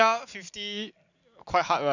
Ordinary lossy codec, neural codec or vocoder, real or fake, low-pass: none; none; real; 7.2 kHz